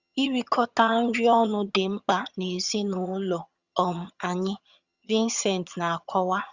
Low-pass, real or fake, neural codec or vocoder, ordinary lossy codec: 7.2 kHz; fake; vocoder, 22.05 kHz, 80 mel bands, HiFi-GAN; Opus, 64 kbps